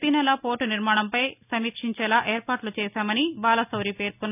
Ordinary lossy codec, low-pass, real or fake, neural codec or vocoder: none; 3.6 kHz; real; none